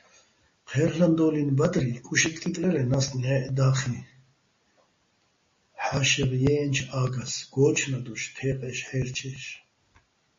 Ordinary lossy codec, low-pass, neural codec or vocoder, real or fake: MP3, 32 kbps; 7.2 kHz; none; real